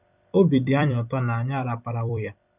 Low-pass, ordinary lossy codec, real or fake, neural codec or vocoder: 3.6 kHz; none; fake; vocoder, 44.1 kHz, 128 mel bands every 256 samples, BigVGAN v2